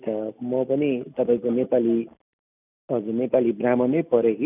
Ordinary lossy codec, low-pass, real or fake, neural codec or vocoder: none; 3.6 kHz; real; none